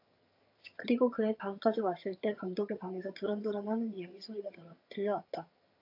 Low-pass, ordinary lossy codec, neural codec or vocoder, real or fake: 5.4 kHz; AAC, 32 kbps; vocoder, 22.05 kHz, 80 mel bands, HiFi-GAN; fake